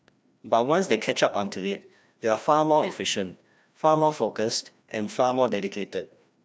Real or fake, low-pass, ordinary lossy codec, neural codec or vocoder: fake; none; none; codec, 16 kHz, 1 kbps, FreqCodec, larger model